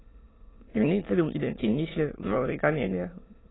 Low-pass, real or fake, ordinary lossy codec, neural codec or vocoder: 7.2 kHz; fake; AAC, 16 kbps; autoencoder, 22.05 kHz, a latent of 192 numbers a frame, VITS, trained on many speakers